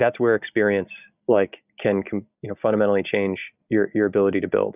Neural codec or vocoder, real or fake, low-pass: none; real; 3.6 kHz